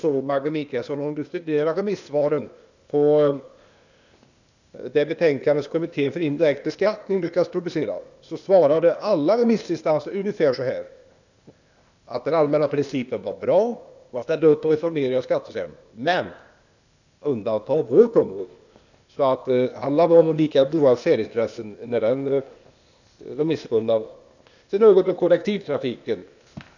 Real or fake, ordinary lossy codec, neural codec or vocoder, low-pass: fake; none; codec, 16 kHz, 0.8 kbps, ZipCodec; 7.2 kHz